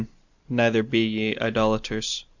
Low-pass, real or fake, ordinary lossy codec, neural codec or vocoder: 7.2 kHz; real; Opus, 64 kbps; none